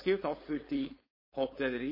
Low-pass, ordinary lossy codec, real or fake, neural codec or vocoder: 5.4 kHz; MP3, 24 kbps; fake; codec, 16 kHz, 4.8 kbps, FACodec